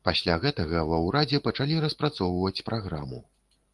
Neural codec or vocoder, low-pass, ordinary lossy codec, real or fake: none; 10.8 kHz; Opus, 24 kbps; real